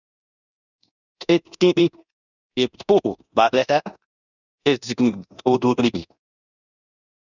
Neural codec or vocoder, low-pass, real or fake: codec, 24 kHz, 0.9 kbps, DualCodec; 7.2 kHz; fake